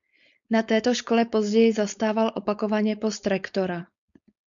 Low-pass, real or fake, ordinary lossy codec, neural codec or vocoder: 7.2 kHz; fake; MP3, 96 kbps; codec, 16 kHz, 4.8 kbps, FACodec